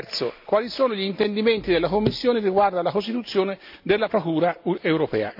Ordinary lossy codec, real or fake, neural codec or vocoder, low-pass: AAC, 48 kbps; real; none; 5.4 kHz